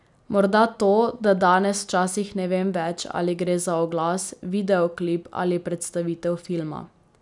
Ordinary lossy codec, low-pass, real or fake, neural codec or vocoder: none; 10.8 kHz; real; none